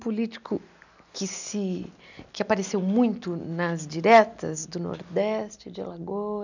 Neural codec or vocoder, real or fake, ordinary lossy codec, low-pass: none; real; none; 7.2 kHz